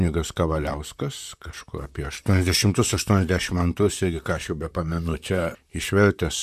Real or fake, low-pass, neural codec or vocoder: fake; 14.4 kHz; vocoder, 44.1 kHz, 128 mel bands, Pupu-Vocoder